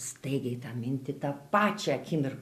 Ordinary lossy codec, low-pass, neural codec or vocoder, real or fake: MP3, 96 kbps; 14.4 kHz; none; real